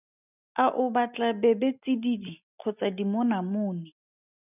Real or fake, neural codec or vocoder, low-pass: real; none; 3.6 kHz